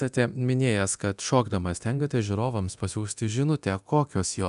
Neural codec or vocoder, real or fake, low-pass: codec, 24 kHz, 0.9 kbps, DualCodec; fake; 10.8 kHz